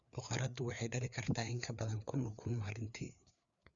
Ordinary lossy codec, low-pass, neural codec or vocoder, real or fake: Opus, 64 kbps; 7.2 kHz; codec, 16 kHz, 4 kbps, FreqCodec, larger model; fake